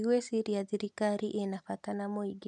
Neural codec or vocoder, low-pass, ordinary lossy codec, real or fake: none; 10.8 kHz; none; real